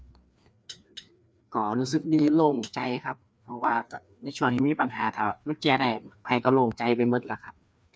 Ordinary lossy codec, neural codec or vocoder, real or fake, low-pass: none; codec, 16 kHz, 2 kbps, FreqCodec, larger model; fake; none